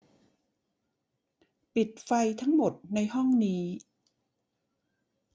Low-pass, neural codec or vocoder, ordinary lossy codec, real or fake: none; none; none; real